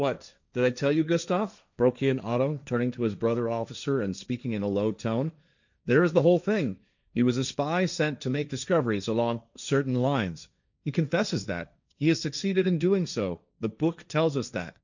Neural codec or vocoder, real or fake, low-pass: codec, 16 kHz, 1.1 kbps, Voila-Tokenizer; fake; 7.2 kHz